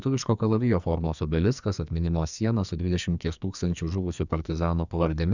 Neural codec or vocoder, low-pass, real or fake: codec, 44.1 kHz, 2.6 kbps, SNAC; 7.2 kHz; fake